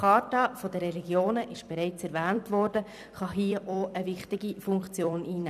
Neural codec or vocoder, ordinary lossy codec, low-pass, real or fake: vocoder, 44.1 kHz, 128 mel bands every 256 samples, BigVGAN v2; none; 14.4 kHz; fake